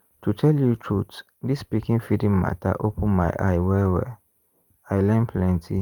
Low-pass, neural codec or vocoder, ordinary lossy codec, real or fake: 19.8 kHz; vocoder, 44.1 kHz, 128 mel bands every 512 samples, BigVGAN v2; Opus, 32 kbps; fake